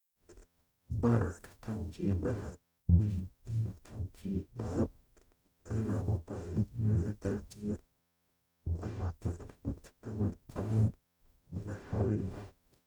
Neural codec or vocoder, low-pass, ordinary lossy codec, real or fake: codec, 44.1 kHz, 0.9 kbps, DAC; 19.8 kHz; none; fake